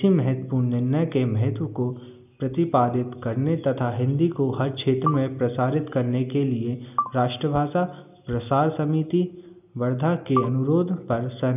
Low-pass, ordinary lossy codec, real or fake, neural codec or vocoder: 3.6 kHz; none; real; none